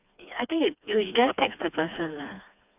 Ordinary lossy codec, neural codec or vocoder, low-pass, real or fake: none; codec, 16 kHz, 2 kbps, FreqCodec, smaller model; 3.6 kHz; fake